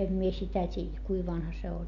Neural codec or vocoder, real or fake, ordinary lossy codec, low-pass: none; real; none; 7.2 kHz